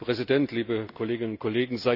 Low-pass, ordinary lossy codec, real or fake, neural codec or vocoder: 5.4 kHz; none; real; none